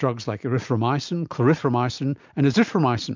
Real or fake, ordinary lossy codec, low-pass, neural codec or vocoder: real; MP3, 64 kbps; 7.2 kHz; none